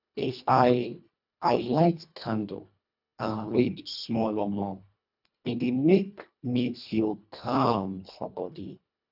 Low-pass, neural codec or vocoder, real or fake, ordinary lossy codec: 5.4 kHz; codec, 24 kHz, 1.5 kbps, HILCodec; fake; none